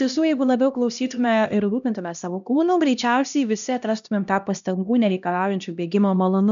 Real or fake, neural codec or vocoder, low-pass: fake; codec, 16 kHz, 1 kbps, X-Codec, HuBERT features, trained on LibriSpeech; 7.2 kHz